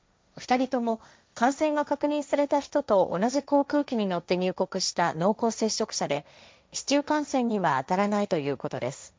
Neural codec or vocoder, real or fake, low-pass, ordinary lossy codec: codec, 16 kHz, 1.1 kbps, Voila-Tokenizer; fake; none; none